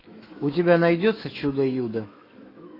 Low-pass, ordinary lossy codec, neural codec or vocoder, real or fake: 5.4 kHz; AAC, 24 kbps; autoencoder, 48 kHz, 128 numbers a frame, DAC-VAE, trained on Japanese speech; fake